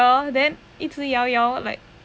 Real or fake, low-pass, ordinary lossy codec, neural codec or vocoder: real; none; none; none